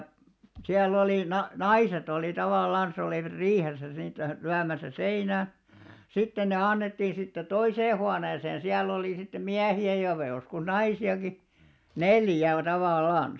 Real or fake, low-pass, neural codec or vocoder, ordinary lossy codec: real; none; none; none